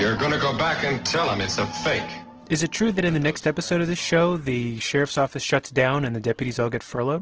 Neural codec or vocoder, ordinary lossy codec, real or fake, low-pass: none; Opus, 16 kbps; real; 7.2 kHz